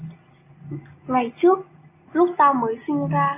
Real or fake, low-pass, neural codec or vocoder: real; 3.6 kHz; none